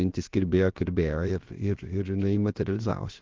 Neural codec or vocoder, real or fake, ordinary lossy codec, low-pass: codec, 16 kHz, about 1 kbps, DyCAST, with the encoder's durations; fake; Opus, 16 kbps; 7.2 kHz